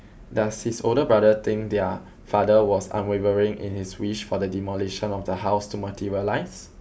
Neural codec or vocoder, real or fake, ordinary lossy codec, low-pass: none; real; none; none